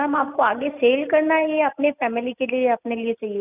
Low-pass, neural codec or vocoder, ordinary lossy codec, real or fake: 3.6 kHz; none; none; real